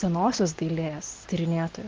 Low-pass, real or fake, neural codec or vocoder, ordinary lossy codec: 7.2 kHz; real; none; Opus, 16 kbps